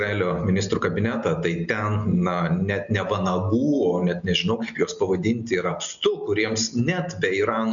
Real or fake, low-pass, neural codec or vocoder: real; 7.2 kHz; none